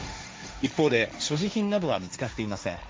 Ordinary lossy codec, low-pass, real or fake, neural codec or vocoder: none; none; fake; codec, 16 kHz, 1.1 kbps, Voila-Tokenizer